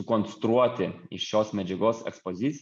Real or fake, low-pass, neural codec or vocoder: real; 9.9 kHz; none